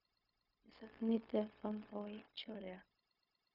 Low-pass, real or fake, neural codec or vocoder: 5.4 kHz; fake; codec, 16 kHz, 0.4 kbps, LongCat-Audio-Codec